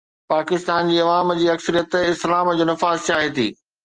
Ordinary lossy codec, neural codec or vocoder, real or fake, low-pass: Opus, 24 kbps; none; real; 9.9 kHz